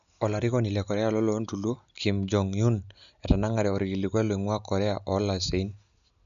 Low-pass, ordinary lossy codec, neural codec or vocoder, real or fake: 7.2 kHz; none; none; real